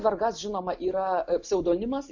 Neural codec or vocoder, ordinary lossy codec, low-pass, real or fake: none; MP3, 48 kbps; 7.2 kHz; real